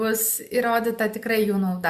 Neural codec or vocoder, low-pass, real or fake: none; 14.4 kHz; real